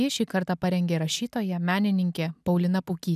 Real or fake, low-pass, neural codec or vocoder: real; 14.4 kHz; none